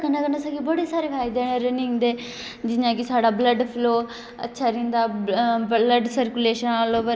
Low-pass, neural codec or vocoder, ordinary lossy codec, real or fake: none; none; none; real